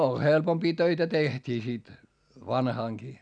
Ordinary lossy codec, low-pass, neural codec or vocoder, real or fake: none; 9.9 kHz; none; real